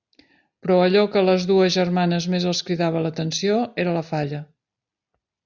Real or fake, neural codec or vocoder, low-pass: real; none; 7.2 kHz